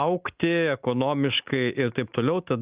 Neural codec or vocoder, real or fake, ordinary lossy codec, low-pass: none; real; Opus, 32 kbps; 3.6 kHz